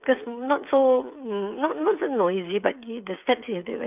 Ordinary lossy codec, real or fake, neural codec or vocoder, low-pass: none; fake; codec, 16 kHz, 8 kbps, FreqCodec, smaller model; 3.6 kHz